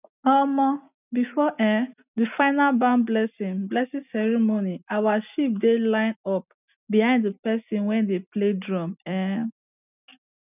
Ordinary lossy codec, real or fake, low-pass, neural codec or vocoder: none; real; 3.6 kHz; none